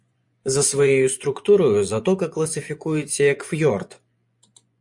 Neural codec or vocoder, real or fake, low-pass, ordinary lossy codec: vocoder, 24 kHz, 100 mel bands, Vocos; fake; 10.8 kHz; MP3, 64 kbps